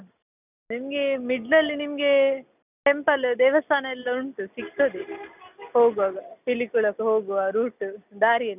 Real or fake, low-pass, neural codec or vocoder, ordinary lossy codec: real; 3.6 kHz; none; none